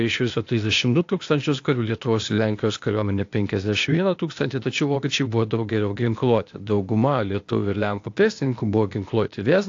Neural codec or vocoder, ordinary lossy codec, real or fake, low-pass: codec, 16 kHz, 0.8 kbps, ZipCodec; AAC, 48 kbps; fake; 7.2 kHz